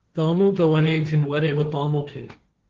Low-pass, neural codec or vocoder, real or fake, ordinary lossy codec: 7.2 kHz; codec, 16 kHz, 1.1 kbps, Voila-Tokenizer; fake; Opus, 16 kbps